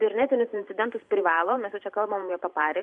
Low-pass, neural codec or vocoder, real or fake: 10.8 kHz; vocoder, 44.1 kHz, 128 mel bands every 512 samples, BigVGAN v2; fake